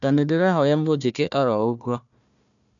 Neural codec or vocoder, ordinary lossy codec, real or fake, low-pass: codec, 16 kHz, 1 kbps, FunCodec, trained on Chinese and English, 50 frames a second; none; fake; 7.2 kHz